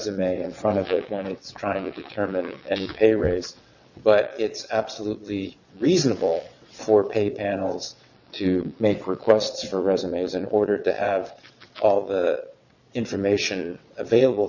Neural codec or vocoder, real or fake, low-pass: vocoder, 22.05 kHz, 80 mel bands, WaveNeXt; fake; 7.2 kHz